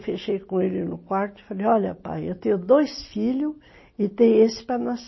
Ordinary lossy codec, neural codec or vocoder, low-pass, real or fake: MP3, 24 kbps; none; 7.2 kHz; real